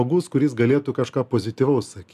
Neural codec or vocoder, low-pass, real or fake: none; 14.4 kHz; real